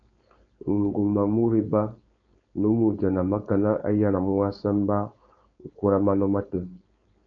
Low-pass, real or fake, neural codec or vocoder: 7.2 kHz; fake; codec, 16 kHz, 4.8 kbps, FACodec